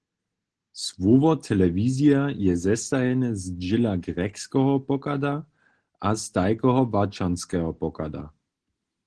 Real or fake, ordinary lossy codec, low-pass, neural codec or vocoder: real; Opus, 16 kbps; 9.9 kHz; none